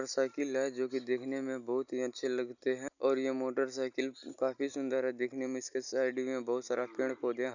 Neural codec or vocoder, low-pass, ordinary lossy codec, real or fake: none; 7.2 kHz; none; real